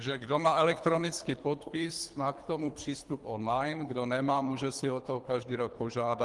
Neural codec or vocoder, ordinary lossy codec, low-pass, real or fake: codec, 24 kHz, 3 kbps, HILCodec; Opus, 24 kbps; 10.8 kHz; fake